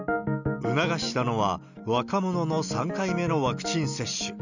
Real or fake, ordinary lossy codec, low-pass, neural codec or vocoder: real; none; 7.2 kHz; none